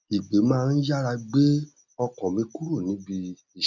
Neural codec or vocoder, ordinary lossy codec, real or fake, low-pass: none; none; real; 7.2 kHz